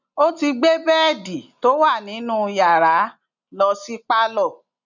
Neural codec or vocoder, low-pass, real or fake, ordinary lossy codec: none; 7.2 kHz; real; none